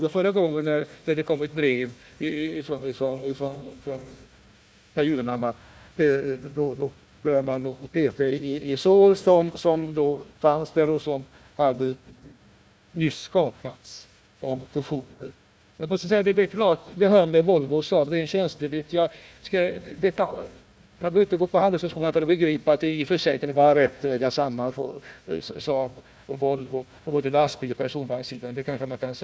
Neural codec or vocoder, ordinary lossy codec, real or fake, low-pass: codec, 16 kHz, 1 kbps, FunCodec, trained on Chinese and English, 50 frames a second; none; fake; none